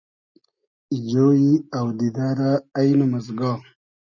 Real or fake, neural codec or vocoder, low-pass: real; none; 7.2 kHz